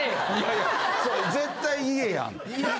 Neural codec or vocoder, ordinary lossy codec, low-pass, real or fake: none; none; none; real